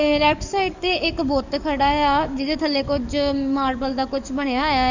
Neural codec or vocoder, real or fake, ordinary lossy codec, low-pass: codec, 44.1 kHz, 7.8 kbps, DAC; fake; none; 7.2 kHz